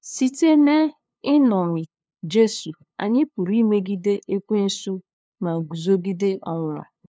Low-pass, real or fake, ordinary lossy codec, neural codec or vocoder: none; fake; none; codec, 16 kHz, 2 kbps, FunCodec, trained on LibriTTS, 25 frames a second